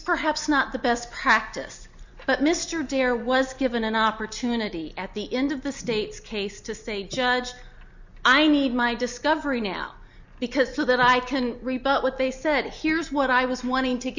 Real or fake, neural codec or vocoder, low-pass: real; none; 7.2 kHz